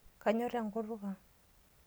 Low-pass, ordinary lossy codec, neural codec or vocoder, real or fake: none; none; none; real